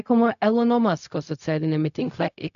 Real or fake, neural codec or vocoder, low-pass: fake; codec, 16 kHz, 0.4 kbps, LongCat-Audio-Codec; 7.2 kHz